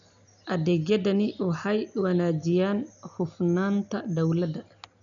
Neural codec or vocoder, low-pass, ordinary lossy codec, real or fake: none; 7.2 kHz; none; real